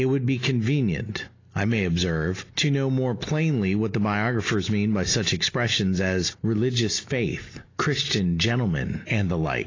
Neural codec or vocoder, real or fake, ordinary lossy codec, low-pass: none; real; AAC, 32 kbps; 7.2 kHz